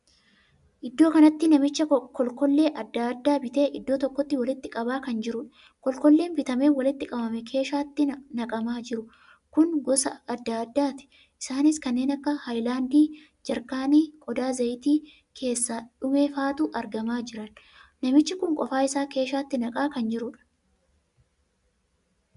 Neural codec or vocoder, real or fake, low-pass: none; real; 10.8 kHz